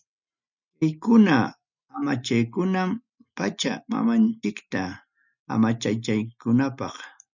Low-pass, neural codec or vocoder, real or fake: 7.2 kHz; none; real